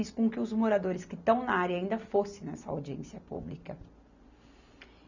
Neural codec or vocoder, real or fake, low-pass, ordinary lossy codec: none; real; 7.2 kHz; none